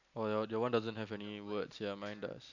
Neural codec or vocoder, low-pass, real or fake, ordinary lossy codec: none; 7.2 kHz; real; none